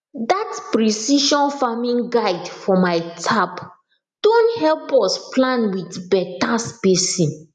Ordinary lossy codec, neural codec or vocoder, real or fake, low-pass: none; none; real; 10.8 kHz